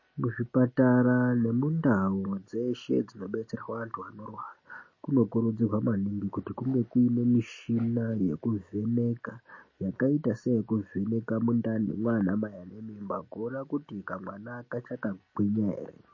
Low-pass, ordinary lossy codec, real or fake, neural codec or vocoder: 7.2 kHz; MP3, 32 kbps; real; none